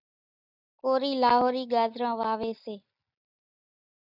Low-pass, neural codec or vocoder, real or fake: 5.4 kHz; none; real